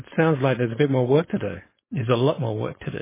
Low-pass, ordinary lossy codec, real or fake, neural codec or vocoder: 3.6 kHz; MP3, 16 kbps; fake; codec, 16 kHz, 4.8 kbps, FACodec